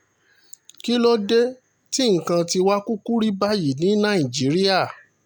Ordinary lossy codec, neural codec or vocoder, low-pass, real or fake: none; none; 19.8 kHz; real